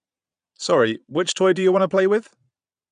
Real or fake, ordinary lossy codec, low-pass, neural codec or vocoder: fake; none; 9.9 kHz; vocoder, 22.05 kHz, 80 mel bands, Vocos